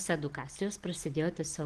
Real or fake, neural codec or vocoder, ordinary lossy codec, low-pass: real; none; Opus, 16 kbps; 10.8 kHz